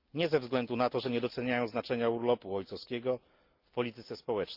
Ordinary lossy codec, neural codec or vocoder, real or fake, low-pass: Opus, 32 kbps; none; real; 5.4 kHz